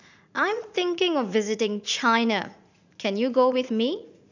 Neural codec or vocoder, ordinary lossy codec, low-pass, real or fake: vocoder, 44.1 kHz, 80 mel bands, Vocos; none; 7.2 kHz; fake